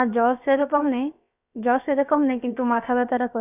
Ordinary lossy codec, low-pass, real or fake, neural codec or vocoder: none; 3.6 kHz; fake; codec, 16 kHz, about 1 kbps, DyCAST, with the encoder's durations